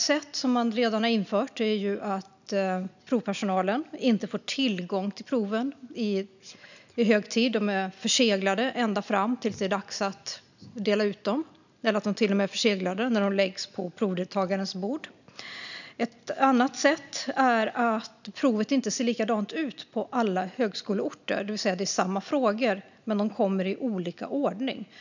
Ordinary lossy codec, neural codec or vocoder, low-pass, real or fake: none; none; 7.2 kHz; real